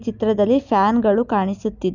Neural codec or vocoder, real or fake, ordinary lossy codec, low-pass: none; real; none; 7.2 kHz